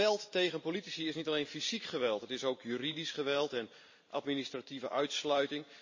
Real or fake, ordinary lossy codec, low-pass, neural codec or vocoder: fake; MP3, 32 kbps; 7.2 kHz; vocoder, 44.1 kHz, 128 mel bands every 512 samples, BigVGAN v2